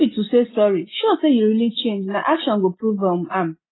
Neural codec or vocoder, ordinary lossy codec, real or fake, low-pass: none; AAC, 16 kbps; real; 7.2 kHz